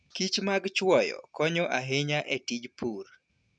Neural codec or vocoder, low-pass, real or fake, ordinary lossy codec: none; 9.9 kHz; real; none